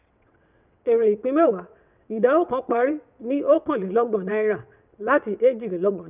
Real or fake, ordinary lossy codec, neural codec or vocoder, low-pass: fake; none; vocoder, 22.05 kHz, 80 mel bands, WaveNeXt; 3.6 kHz